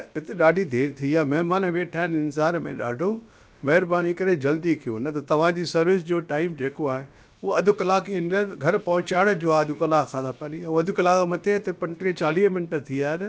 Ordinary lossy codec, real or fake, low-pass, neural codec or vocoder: none; fake; none; codec, 16 kHz, about 1 kbps, DyCAST, with the encoder's durations